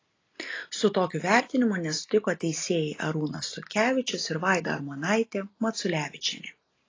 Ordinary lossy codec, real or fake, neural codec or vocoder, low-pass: AAC, 32 kbps; real; none; 7.2 kHz